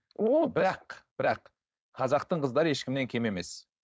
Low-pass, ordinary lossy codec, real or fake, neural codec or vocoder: none; none; fake; codec, 16 kHz, 4.8 kbps, FACodec